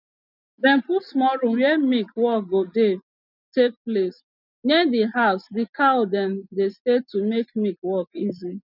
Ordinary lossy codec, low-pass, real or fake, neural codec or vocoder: none; 5.4 kHz; real; none